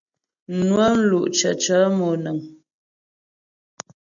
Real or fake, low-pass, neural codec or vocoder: real; 7.2 kHz; none